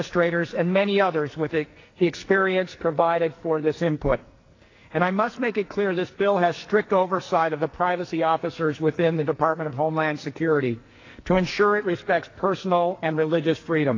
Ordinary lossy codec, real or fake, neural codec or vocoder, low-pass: AAC, 32 kbps; fake; codec, 44.1 kHz, 2.6 kbps, SNAC; 7.2 kHz